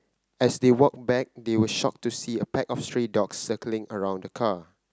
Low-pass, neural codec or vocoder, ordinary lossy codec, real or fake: none; none; none; real